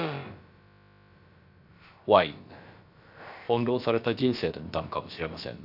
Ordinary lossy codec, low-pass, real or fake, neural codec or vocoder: none; 5.4 kHz; fake; codec, 16 kHz, about 1 kbps, DyCAST, with the encoder's durations